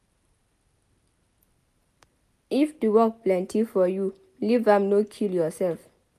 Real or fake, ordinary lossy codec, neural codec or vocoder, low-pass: real; none; none; 14.4 kHz